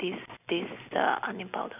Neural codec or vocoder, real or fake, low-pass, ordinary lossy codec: none; real; 3.6 kHz; none